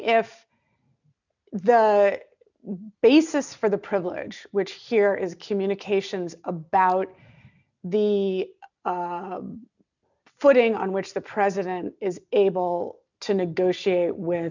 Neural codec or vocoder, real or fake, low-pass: none; real; 7.2 kHz